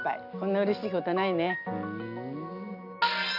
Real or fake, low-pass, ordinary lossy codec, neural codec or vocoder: real; 5.4 kHz; none; none